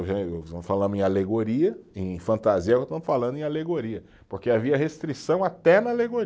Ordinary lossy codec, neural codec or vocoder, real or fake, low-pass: none; none; real; none